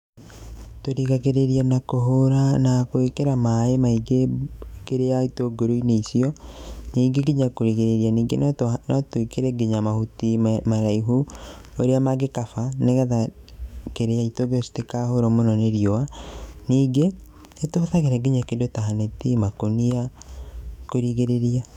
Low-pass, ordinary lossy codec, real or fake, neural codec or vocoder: 19.8 kHz; none; fake; autoencoder, 48 kHz, 128 numbers a frame, DAC-VAE, trained on Japanese speech